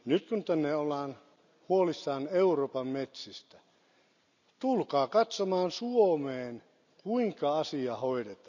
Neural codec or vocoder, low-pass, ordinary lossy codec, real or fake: none; 7.2 kHz; none; real